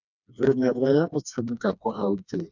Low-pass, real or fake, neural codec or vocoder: 7.2 kHz; fake; codec, 16 kHz, 2 kbps, FreqCodec, smaller model